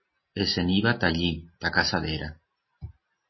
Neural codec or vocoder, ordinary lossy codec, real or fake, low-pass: none; MP3, 24 kbps; real; 7.2 kHz